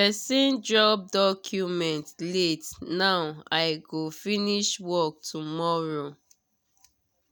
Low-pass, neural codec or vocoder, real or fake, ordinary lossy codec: none; none; real; none